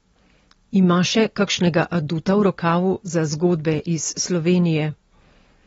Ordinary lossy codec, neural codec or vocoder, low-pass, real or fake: AAC, 24 kbps; none; 19.8 kHz; real